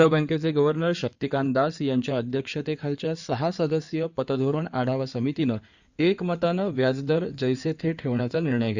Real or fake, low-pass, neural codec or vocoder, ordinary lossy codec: fake; 7.2 kHz; codec, 16 kHz in and 24 kHz out, 2.2 kbps, FireRedTTS-2 codec; Opus, 64 kbps